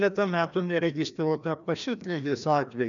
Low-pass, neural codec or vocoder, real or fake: 7.2 kHz; codec, 16 kHz, 1 kbps, FreqCodec, larger model; fake